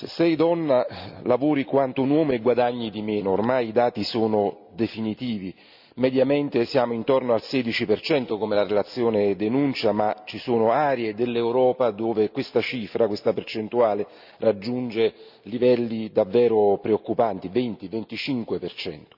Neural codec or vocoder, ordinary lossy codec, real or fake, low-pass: none; none; real; 5.4 kHz